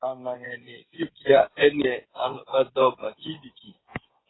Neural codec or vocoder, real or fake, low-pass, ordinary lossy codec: codec, 16 kHz, 8 kbps, FreqCodec, smaller model; fake; 7.2 kHz; AAC, 16 kbps